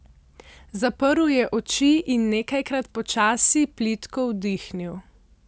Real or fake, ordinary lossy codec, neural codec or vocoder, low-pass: real; none; none; none